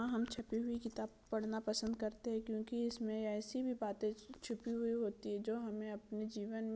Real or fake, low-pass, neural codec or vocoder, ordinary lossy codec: real; none; none; none